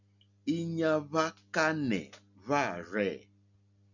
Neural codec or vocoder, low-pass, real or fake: none; 7.2 kHz; real